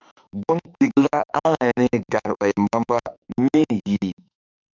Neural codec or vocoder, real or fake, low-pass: autoencoder, 48 kHz, 32 numbers a frame, DAC-VAE, trained on Japanese speech; fake; 7.2 kHz